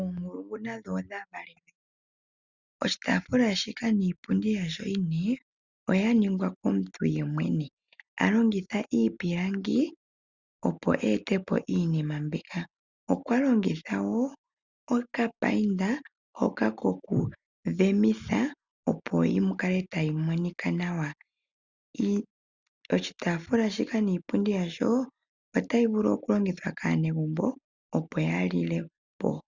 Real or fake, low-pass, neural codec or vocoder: real; 7.2 kHz; none